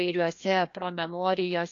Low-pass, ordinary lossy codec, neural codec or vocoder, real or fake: 7.2 kHz; AAC, 48 kbps; codec, 16 kHz, 1 kbps, FreqCodec, larger model; fake